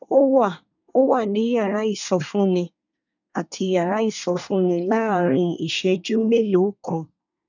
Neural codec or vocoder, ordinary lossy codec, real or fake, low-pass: codec, 24 kHz, 1 kbps, SNAC; none; fake; 7.2 kHz